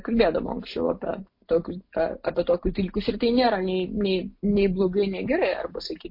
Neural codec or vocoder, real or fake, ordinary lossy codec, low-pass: none; real; MP3, 32 kbps; 5.4 kHz